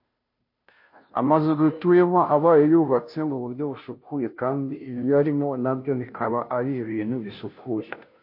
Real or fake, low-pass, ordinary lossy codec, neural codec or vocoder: fake; 5.4 kHz; MP3, 32 kbps; codec, 16 kHz, 0.5 kbps, FunCodec, trained on Chinese and English, 25 frames a second